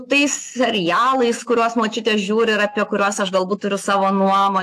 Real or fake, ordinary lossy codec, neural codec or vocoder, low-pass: fake; AAC, 64 kbps; autoencoder, 48 kHz, 128 numbers a frame, DAC-VAE, trained on Japanese speech; 14.4 kHz